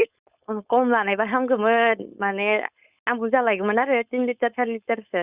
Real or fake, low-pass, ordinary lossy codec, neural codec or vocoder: fake; 3.6 kHz; Opus, 64 kbps; codec, 16 kHz, 4.8 kbps, FACodec